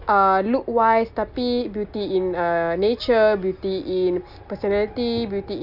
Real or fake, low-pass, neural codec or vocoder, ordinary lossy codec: real; 5.4 kHz; none; none